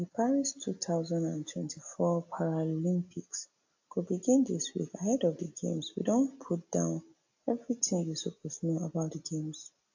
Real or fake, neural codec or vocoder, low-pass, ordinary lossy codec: real; none; 7.2 kHz; AAC, 48 kbps